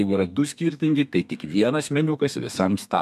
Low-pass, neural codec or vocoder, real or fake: 14.4 kHz; codec, 32 kHz, 1.9 kbps, SNAC; fake